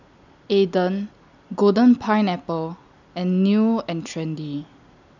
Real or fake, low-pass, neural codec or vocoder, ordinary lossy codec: real; 7.2 kHz; none; none